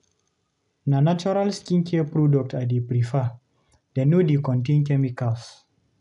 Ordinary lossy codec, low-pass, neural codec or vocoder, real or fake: none; 10.8 kHz; none; real